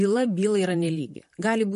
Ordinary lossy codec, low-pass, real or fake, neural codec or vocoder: MP3, 48 kbps; 14.4 kHz; fake; vocoder, 44.1 kHz, 128 mel bands, Pupu-Vocoder